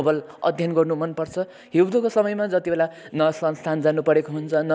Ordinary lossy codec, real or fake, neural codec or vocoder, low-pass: none; real; none; none